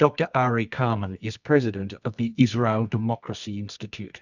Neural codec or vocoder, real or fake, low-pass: codec, 24 kHz, 0.9 kbps, WavTokenizer, medium music audio release; fake; 7.2 kHz